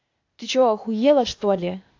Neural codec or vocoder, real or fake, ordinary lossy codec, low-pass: codec, 16 kHz, 0.8 kbps, ZipCodec; fake; AAC, 48 kbps; 7.2 kHz